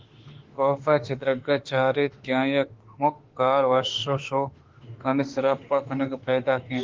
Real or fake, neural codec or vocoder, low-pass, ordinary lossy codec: fake; autoencoder, 48 kHz, 32 numbers a frame, DAC-VAE, trained on Japanese speech; 7.2 kHz; Opus, 16 kbps